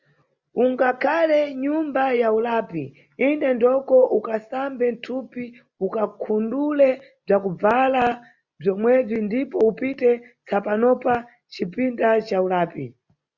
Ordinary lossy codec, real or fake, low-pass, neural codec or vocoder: Opus, 64 kbps; real; 7.2 kHz; none